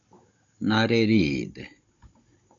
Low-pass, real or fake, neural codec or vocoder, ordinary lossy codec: 7.2 kHz; fake; codec, 16 kHz, 16 kbps, FunCodec, trained on Chinese and English, 50 frames a second; MP3, 48 kbps